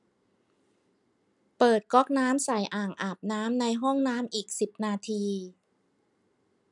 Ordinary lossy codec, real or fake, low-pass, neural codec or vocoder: none; real; 10.8 kHz; none